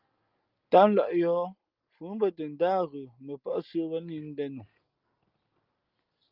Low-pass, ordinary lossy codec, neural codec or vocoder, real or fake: 5.4 kHz; Opus, 24 kbps; none; real